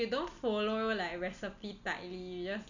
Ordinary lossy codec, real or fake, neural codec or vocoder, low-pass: none; real; none; 7.2 kHz